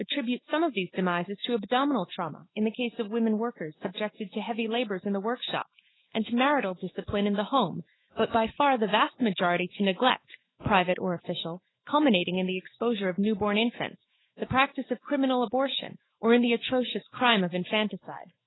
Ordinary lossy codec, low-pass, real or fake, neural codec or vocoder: AAC, 16 kbps; 7.2 kHz; fake; autoencoder, 48 kHz, 128 numbers a frame, DAC-VAE, trained on Japanese speech